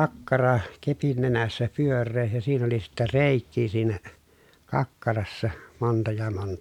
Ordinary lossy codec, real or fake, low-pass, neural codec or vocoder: none; real; 19.8 kHz; none